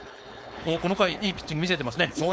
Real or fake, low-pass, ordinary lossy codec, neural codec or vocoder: fake; none; none; codec, 16 kHz, 4.8 kbps, FACodec